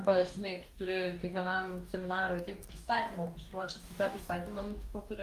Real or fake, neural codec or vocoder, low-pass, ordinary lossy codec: fake; codec, 44.1 kHz, 2.6 kbps, DAC; 14.4 kHz; Opus, 32 kbps